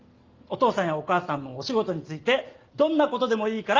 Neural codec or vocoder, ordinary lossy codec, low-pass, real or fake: none; Opus, 32 kbps; 7.2 kHz; real